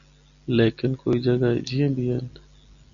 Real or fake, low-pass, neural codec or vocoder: real; 7.2 kHz; none